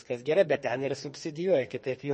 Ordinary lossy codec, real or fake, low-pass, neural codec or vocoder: MP3, 32 kbps; fake; 10.8 kHz; codec, 32 kHz, 1.9 kbps, SNAC